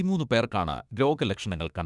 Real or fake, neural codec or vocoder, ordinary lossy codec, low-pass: fake; autoencoder, 48 kHz, 32 numbers a frame, DAC-VAE, trained on Japanese speech; Opus, 64 kbps; 10.8 kHz